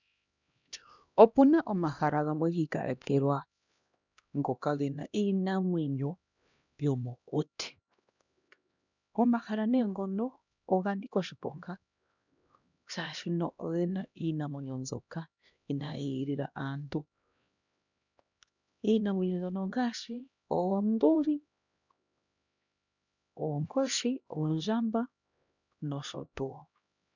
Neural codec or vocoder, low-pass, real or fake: codec, 16 kHz, 1 kbps, X-Codec, HuBERT features, trained on LibriSpeech; 7.2 kHz; fake